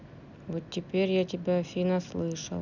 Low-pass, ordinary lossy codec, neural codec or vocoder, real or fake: 7.2 kHz; none; none; real